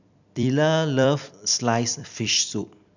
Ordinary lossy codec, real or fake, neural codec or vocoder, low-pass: none; fake; vocoder, 44.1 kHz, 128 mel bands every 256 samples, BigVGAN v2; 7.2 kHz